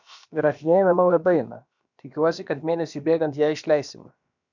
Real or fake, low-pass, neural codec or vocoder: fake; 7.2 kHz; codec, 16 kHz, about 1 kbps, DyCAST, with the encoder's durations